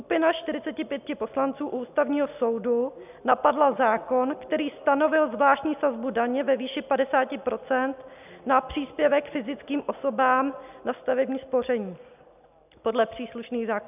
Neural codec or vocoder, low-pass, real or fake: none; 3.6 kHz; real